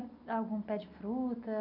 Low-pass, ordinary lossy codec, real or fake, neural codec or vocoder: 5.4 kHz; none; real; none